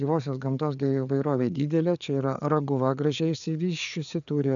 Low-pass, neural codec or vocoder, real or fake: 7.2 kHz; codec, 16 kHz, 4 kbps, FreqCodec, larger model; fake